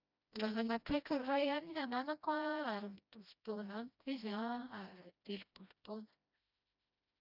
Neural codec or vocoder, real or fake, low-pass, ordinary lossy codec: codec, 16 kHz, 1 kbps, FreqCodec, smaller model; fake; 5.4 kHz; none